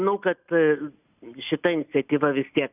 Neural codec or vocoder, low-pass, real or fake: none; 3.6 kHz; real